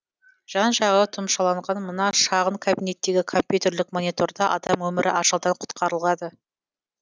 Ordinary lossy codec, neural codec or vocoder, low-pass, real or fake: none; none; none; real